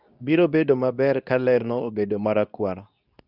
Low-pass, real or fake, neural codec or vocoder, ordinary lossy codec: 5.4 kHz; fake; codec, 24 kHz, 0.9 kbps, WavTokenizer, medium speech release version 2; none